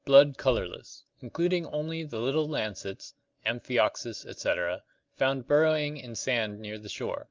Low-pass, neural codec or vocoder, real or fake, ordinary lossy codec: 7.2 kHz; none; real; Opus, 24 kbps